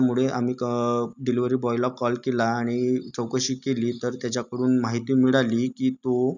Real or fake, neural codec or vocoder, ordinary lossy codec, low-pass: real; none; none; 7.2 kHz